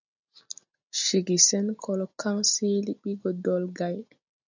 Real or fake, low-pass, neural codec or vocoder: real; 7.2 kHz; none